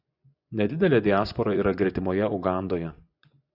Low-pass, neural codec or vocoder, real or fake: 5.4 kHz; vocoder, 24 kHz, 100 mel bands, Vocos; fake